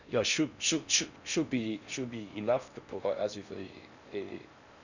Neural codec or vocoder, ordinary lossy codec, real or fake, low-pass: codec, 16 kHz in and 24 kHz out, 0.6 kbps, FocalCodec, streaming, 4096 codes; none; fake; 7.2 kHz